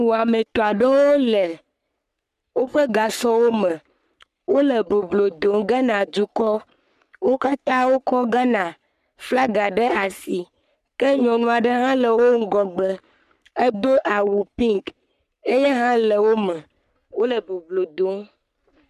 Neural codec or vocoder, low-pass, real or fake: codec, 44.1 kHz, 3.4 kbps, Pupu-Codec; 14.4 kHz; fake